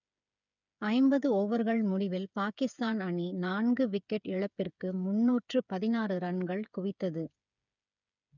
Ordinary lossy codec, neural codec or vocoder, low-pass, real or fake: none; codec, 16 kHz, 8 kbps, FreqCodec, smaller model; 7.2 kHz; fake